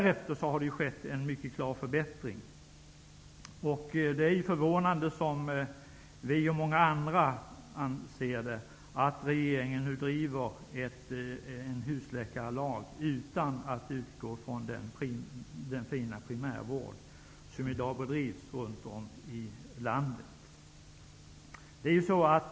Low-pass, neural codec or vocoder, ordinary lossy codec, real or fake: none; none; none; real